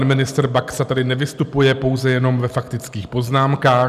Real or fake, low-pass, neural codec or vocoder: real; 14.4 kHz; none